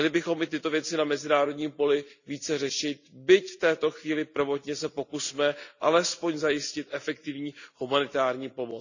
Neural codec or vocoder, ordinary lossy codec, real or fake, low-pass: none; none; real; 7.2 kHz